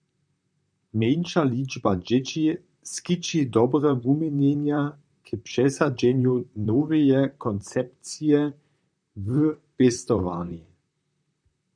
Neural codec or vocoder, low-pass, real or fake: vocoder, 44.1 kHz, 128 mel bands, Pupu-Vocoder; 9.9 kHz; fake